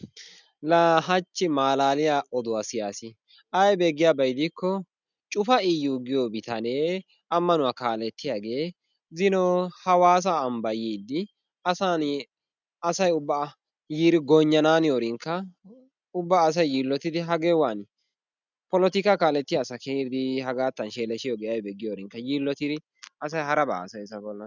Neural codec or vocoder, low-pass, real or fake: none; 7.2 kHz; real